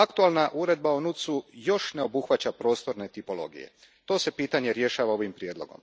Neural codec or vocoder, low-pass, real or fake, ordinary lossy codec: none; none; real; none